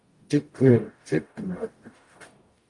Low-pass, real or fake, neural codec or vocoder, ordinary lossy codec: 10.8 kHz; fake; codec, 44.1 kHz, 0.9 kbps, DAC; Opus, 32 kbps